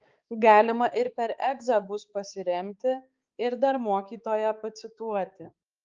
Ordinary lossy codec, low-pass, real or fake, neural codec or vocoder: Opus, 24 kbps; 7.2 kHz; fake; codec, 16 kHz, 4 kbps, X-Codec, HuBERT features, trained on balanced general audio